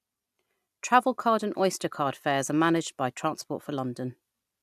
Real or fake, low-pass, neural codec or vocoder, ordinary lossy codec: real; 14.4 kHz; none; AAC, 96 kbps